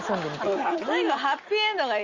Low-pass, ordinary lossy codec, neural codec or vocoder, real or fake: 7.2 kHz; Opus, 32 kbps; none; real